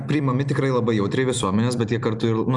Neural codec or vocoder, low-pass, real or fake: vocoder, 44.1 kHz, 128 mel bands every 256 samples, BigVGAN v2; 10.8 kHz; fake